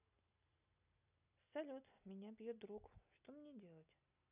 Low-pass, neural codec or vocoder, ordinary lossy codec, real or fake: 3.6 kHz; none; none; real